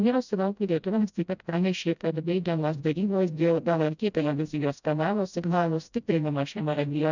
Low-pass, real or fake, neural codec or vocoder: 7.2 kHz; fake; codec, 16 kHz, 0.5 kbps, FreqCodec, smaller model